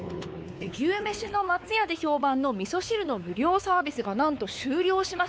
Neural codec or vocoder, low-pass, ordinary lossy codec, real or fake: codec, 16 kHz, 4 kbps, X-Codec, WavLM features, trained on Multilingual LibriSpeech; none; none; fake